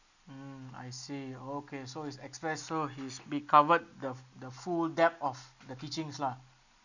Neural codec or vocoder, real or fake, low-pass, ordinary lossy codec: none; real; 7.2 kHz; none